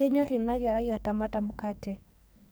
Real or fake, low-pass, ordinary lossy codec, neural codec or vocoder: fake; none; none; codec, 44.1 kHz, 2.6 kbps, SNAC